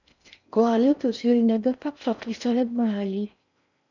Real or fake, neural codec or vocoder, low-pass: fake; codec, 16 kHz in and 24 kHz out, 0.6 kbps, FocalCodec, streaming, 4096 codes; 7.2 kHz